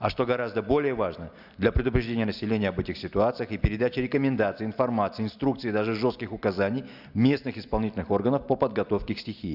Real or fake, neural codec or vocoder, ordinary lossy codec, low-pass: real; none; Opus, 64 kbps; 5.4 kHz